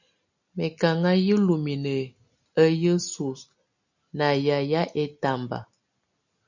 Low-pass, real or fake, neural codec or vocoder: 7.2 kHz; real; none